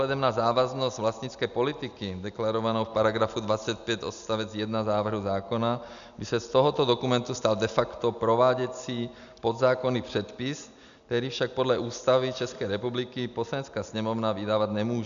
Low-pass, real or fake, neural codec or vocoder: 7.2 kHz; real; none